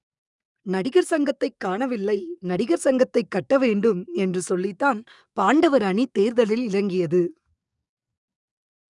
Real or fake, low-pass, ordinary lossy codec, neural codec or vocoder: fake; 10.8 kHz; none; codec, 44.1 kHz, 7.8 kbps, DAC